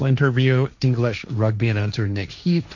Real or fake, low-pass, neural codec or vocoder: fake; 7.2 kHz; codec, 16 kHz, 1.1 kbps, Voila-Tokenizer